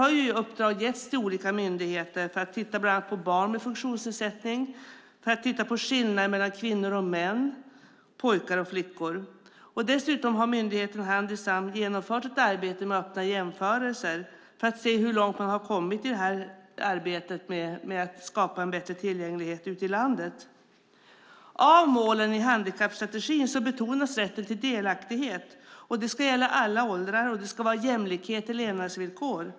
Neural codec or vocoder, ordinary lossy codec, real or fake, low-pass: none; none; real; none